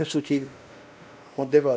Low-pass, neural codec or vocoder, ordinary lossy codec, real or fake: none; codec, 16 kHz, 1 kbps, X-Codec, WavLM features, trained on Multilingual LibriSpeech; none; fake